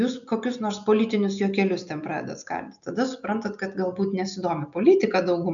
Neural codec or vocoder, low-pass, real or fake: none; 7.2 kHz; real